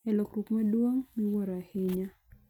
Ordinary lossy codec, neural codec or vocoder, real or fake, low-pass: none; none; real; 19.8 kHz